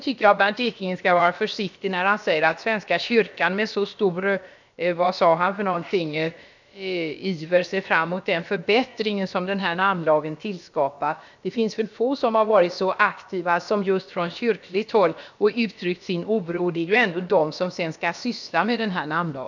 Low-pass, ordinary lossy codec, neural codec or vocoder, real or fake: 7.2 kHz; none; codec, 16 kHz, about 1 kbps, DyCAST, with the encoder's durations; fake